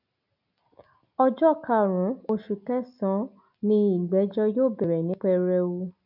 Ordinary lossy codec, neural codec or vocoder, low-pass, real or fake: none; none; 5.4 kHz; real